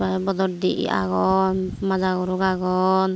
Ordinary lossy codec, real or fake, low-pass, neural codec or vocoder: none; real; none; none